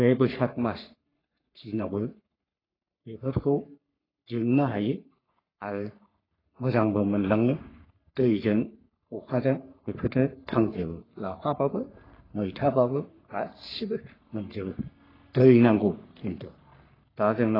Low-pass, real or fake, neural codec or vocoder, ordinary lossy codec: 5.4 kHz; fake; codec, 44.1 kHz, 3.4 kbps, Pupu-Codec; AAC, 24 kbps